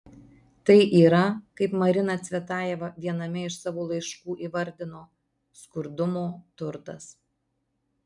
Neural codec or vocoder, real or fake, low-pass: none; real; 10.8 kHz